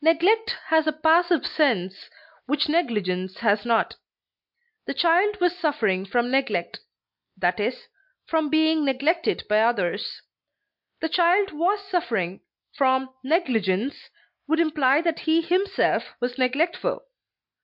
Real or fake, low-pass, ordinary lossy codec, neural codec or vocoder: real; 5.4 kHz; MP3, 48 kbps; none